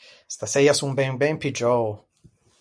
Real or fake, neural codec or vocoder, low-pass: real; none; 9.9 kHz